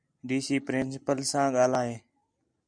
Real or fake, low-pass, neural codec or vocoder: real; 9.9 kHz; none